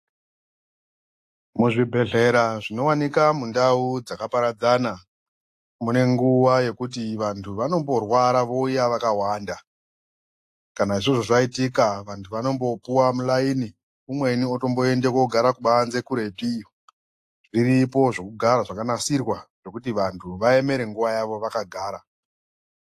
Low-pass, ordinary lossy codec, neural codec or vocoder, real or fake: 14.4 kHz; AAC, 64 kbps; none; real